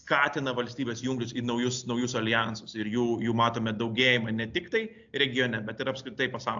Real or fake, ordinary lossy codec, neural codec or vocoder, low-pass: real; AAC, 64 kbps; none; 7.2 kHz